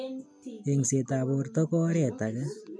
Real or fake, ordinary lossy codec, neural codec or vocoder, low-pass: real; none; none; 9.9 kHz